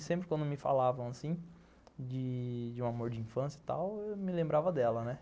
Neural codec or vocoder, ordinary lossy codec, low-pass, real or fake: none; none; none; real